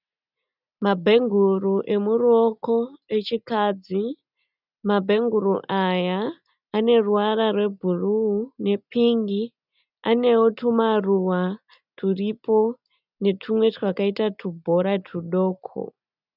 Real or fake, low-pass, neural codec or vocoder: real; 5.4 kHz; none